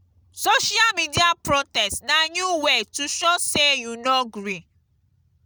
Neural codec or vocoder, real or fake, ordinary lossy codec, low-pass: none; real; none; none